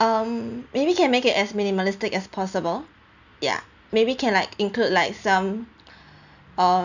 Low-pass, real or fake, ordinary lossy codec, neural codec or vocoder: 7.2 kHz; real; none; none